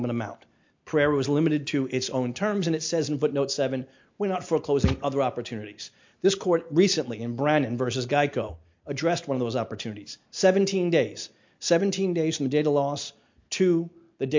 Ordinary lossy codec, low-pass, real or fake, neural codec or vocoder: MP3, 48 kbps; 7.2 kHz; real; none